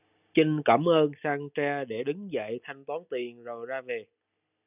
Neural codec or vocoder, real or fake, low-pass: none; real; 3.6 kHz